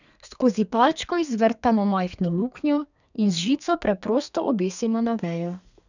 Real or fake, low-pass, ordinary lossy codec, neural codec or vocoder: fake; 7.2 kHz; none; codec, 32 kHz, 1.9 kbps, SNAC